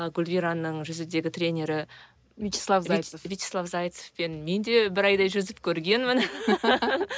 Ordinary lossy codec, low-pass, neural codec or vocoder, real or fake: none; none; none; real